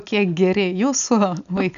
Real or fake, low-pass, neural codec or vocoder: real; 7.2 kHz; none